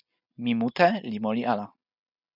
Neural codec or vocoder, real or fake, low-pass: none; real; 5.4 kHz